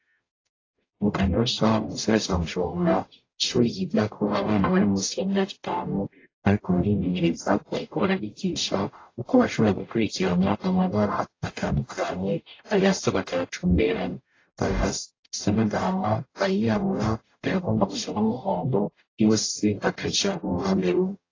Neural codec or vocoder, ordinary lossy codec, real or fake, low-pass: codec, 44.1 kHz, 0.9 kbps, DAC; AAC, 32 kbps; fake; 7.2 kHz